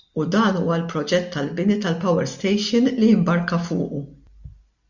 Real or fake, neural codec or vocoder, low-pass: real; none; 7.2 kHz